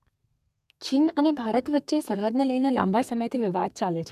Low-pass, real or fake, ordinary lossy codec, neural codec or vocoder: 14.4 kHz; fake; none; codec, 32 kHz, 1.9 kbps, SNAC